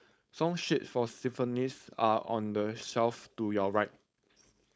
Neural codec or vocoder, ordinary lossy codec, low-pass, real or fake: codec, 16 kHz, 4.8 kbps, FACodec; none; none; fake